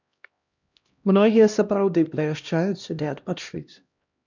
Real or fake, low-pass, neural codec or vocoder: fake; 7.2 kHz; codec, 16 kHz, 1 kbps, X-Codec, HuBERT features, trained on LibriSpeech